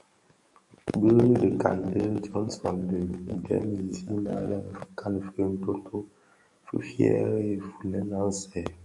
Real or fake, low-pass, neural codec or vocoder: fake; 10.8 kHz; codec, 44.1 kHz, 7.8 kbps, DAC